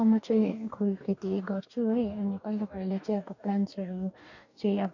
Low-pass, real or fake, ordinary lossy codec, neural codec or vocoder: 7.2 kHz; fake; none; codec, 44.1 kHz, 2.6 kbps, DAC